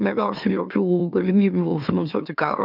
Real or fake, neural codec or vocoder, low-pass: fake; autoencoder, 44.1 kHz, a latent of 192 numbers a frame, MeloTTS; 5.4 kHz